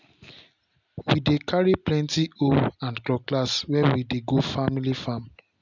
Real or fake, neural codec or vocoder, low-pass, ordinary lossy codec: real; none; 7.2 kHz; none